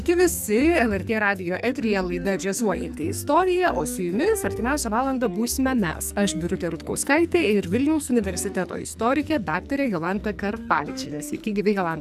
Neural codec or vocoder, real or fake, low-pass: codec, 44.1 kHz, 2.6 kbps, SNAC; fake; 14.4 kHz